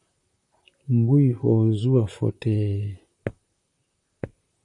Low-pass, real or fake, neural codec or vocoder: 10.8 kHz; fake; vocoder, 44.1 kHz, 128 mel bands, Pupu-Vocoder